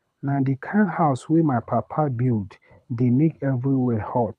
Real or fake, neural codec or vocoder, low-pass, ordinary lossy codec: fake; codec, 24 kHz, 6 kbps, HILCodec; none; none